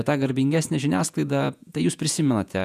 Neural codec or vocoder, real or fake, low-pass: vocoder, 48 kHz, 128 mel bands, Vocos; fake; 14.4 kHz